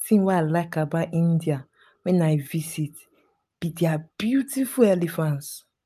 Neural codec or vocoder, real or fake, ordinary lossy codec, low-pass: none; real; none; 14.4 kHz